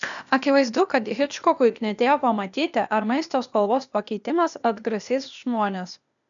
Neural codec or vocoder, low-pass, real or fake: codec, 16 kHz, 0.8 kbps, ZipCodec; 7.2 kHz; fake